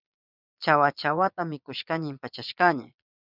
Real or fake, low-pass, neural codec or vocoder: fake; 5.4 kHz; vocoder, 22.05 kHz, 80 mel bands, Vocos